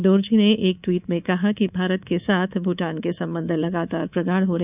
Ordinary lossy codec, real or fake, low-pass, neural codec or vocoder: none; fake; 3.6 kHz; codec, 24 kHz, 3.1 kbps, DualCodec